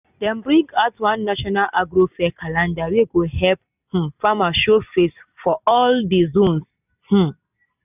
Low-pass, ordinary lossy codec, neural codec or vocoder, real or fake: 3.6 kHz; none; none; real